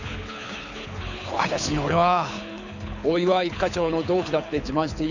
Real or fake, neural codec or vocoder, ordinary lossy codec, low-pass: fake; codec, 24 kHz, 6 kbps, HILCodec; none; 7.2 kHz